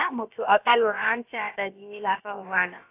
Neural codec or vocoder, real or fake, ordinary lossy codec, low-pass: codec, 16 kHz, about 1 kbps, DyCAST, with the encoder's durations; fake; AAC, 24 kbps; 3.6 kHz